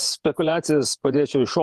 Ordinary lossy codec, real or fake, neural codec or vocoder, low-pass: Opus, 32 kbps; fake; vocoder, 48 kHz, 128 mel bands, Vocos; 14.4 kHz